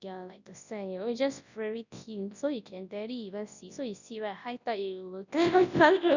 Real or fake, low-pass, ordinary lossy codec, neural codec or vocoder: fake; 7.2 kHz; none; codec, 24 kHz, 0.9 kbps, WavTokenizer, large speech release